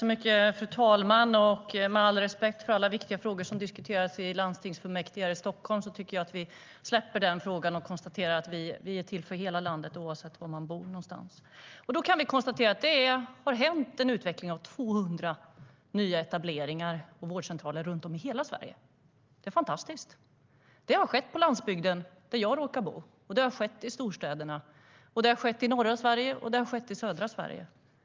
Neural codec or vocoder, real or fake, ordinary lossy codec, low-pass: none; real; Opus, 24 kbps; 7.2 kHz